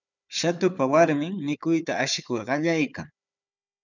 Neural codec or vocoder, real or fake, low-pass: codec, 16 kHz, 4 kbps, FunCodec, trained on Chinese and English, 50 frames a second; fake; 7.2 kHz